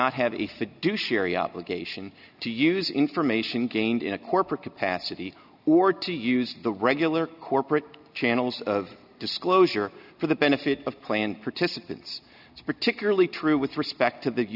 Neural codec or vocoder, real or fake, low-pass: none; real; 5.4 kHz